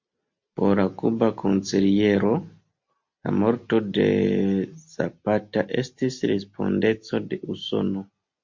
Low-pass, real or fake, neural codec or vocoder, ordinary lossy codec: 7.2 kHz; real; none; MP3, 48 kbps